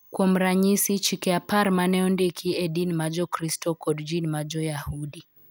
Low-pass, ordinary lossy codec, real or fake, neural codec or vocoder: none; none; real; none